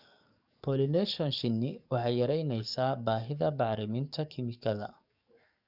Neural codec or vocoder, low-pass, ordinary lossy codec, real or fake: codec, 44.1 kHz, 7.8 kbps, DAC; 5.4 kHz; none; fake